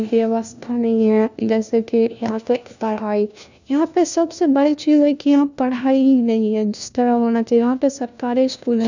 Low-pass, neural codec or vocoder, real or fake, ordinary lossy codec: 7.2 kHz; codec, 16 kHz, 1 kbps, FunCodec, trained on LibriTTS, 50 frames a second; fake; none